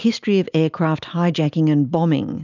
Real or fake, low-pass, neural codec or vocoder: real; 7.2 kHz; none